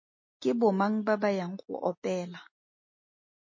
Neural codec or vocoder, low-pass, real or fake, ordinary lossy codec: none; 7.2 kHz; real; MP3, 32 kbps